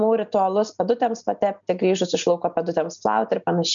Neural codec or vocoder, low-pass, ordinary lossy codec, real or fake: none; 7.2 kHz; MP3, 96 kbps; real